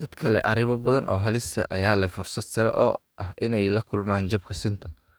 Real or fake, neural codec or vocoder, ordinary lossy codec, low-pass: fake; codec, 44.1 kHz, 2.6 kbps, DAC; none; none